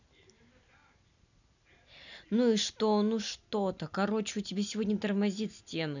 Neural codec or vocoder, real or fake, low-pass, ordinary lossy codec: none; real; 7.2 kHz; none